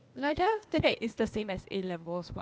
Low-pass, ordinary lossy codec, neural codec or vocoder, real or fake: none; none; codec, 16 kHz, 0.8 kbps, ZipCodec; fake